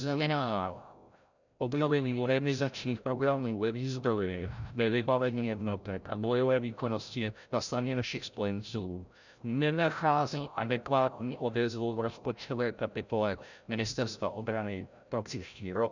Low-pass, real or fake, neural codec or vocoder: 7.2 kHz; fake; codec, 16 kHz, 0.5 kbps, FreqCodec, larger model